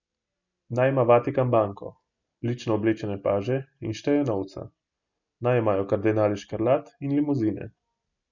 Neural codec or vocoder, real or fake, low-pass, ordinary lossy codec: none; real; 7.2 kHz; none